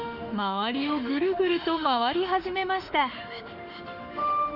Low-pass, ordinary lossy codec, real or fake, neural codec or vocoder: 5.4 kHz; none; fake; autoencoder, 48 kHz, 32 numbers a frame, DAC-VAE, trained on Japanese speech